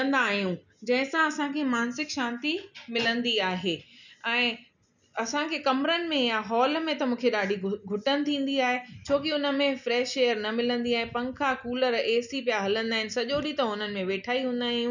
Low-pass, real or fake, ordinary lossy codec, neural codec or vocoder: 7.2 kHz; real; none; none